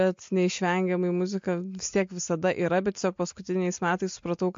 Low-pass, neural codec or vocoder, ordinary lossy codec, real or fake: 7.2 kHz; none; MP3, 48 kbps; real